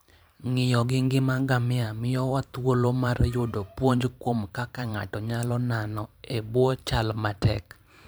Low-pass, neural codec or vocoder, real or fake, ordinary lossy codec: none; vocoder, 44.1 kHz, 128 mel bands every 512 samples, BigVGAN v2; fake; none